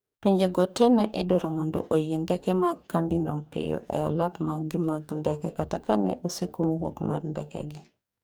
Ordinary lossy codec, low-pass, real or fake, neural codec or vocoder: none; none; fake; codec, 44.1 kHz, 2.6 kbps, DAC